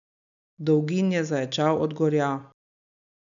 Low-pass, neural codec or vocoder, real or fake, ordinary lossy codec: 7.2 kHz; none; real; none